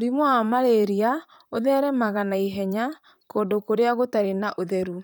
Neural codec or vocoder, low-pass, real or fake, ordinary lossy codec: vocoder, 44.1 kHz, 128 mel bands, Pupu-Vocoder; none; fake; none